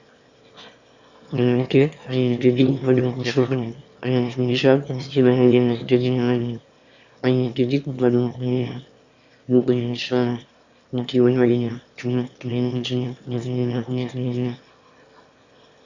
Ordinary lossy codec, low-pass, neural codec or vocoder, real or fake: Opus, 64 kbps; 7.2 kHz; autoencoder, 22.05 kHz, a latent of 192 numbers a frame, VITS, trained on one speaker; fake